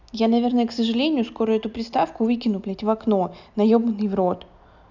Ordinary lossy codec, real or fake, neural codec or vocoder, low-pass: none; real; none; 7.2 kHz